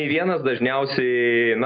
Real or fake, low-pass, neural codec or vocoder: real; 7.2 kHz; none